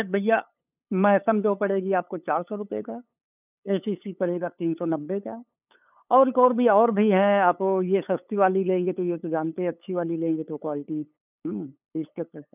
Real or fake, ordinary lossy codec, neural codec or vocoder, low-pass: fake; none; codec, 16 kHz, 8 kbps, FunCodec, trained on LibriTTS, 25 frames a second; 3.6 kHz